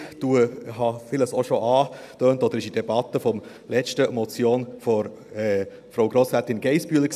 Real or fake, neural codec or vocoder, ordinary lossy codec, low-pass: real; none; none; 14.4 kHz